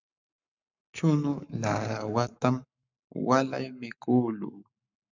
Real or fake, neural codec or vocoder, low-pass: fake; codec, 44.1 kHz, 7.8 kbps, Pupu-Codec; 7.2 kHz